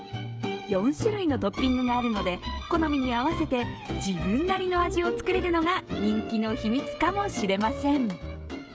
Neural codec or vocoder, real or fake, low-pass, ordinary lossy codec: codec, 16 kHz, 16 kbps, FreqCodec, smaller model; fake; none; none